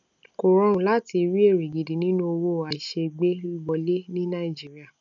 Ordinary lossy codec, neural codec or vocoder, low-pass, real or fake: none; none; 7.2 kHz; real